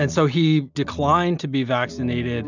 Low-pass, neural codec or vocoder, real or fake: 7.2 kHz; none; real